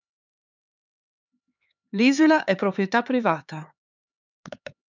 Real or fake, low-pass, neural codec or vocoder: fake; 7.2 kHz; codec, 16 kHz, 4 kbps, X-Codec, HuBERT features, trained on LibriSpeech